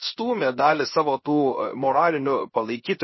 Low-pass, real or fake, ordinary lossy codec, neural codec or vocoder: 7.2 kHz; fake; MP3, 24 kbps; codec, 16 kHz, 0.7 kbps, FocalCodec